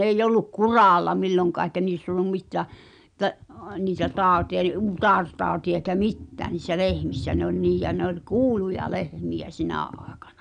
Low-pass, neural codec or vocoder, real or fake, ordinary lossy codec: 9.9 kHz; none; real; none